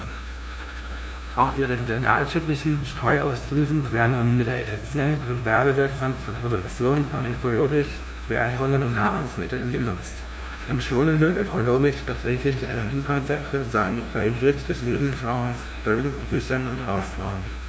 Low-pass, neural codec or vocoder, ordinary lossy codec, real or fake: none; codec, 16 kHz, 0.5 kbps, FunCodec, trained on LibriTTS, 25 frames a second; none; fake